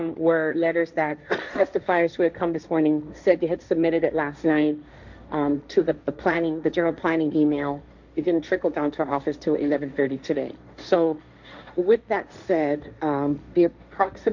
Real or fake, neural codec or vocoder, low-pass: fake; codec, 16 kHz, 1.1 kbps, Voila-Tokenizer; 7.2 kHz